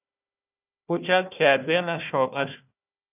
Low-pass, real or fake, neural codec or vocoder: 3.6 kHz; fake; codec, 16 kHz, 1 kbps, FunCodec, trained on Chinese and English, 50 frames a second